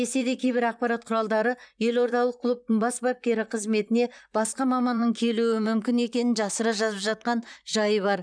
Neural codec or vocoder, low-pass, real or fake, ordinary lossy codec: vocoder, 44.1 kHz, 128 mel bands, Pupu-Vocoder; 9.9 kHz; fake; none